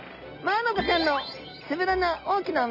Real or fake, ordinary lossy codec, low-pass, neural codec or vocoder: real; none; 5.4 kHz; none